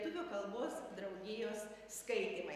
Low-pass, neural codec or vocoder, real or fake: 14.4 kHz; none; real